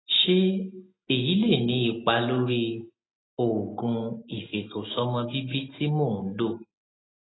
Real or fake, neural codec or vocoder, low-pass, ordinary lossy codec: real; none; 7.2 kHz; AAC, 16 kbps